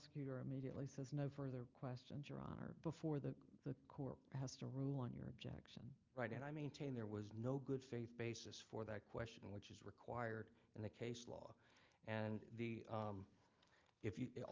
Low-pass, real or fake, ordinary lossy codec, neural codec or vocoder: 7.2 kHz; real; Opus, 24 kbps; none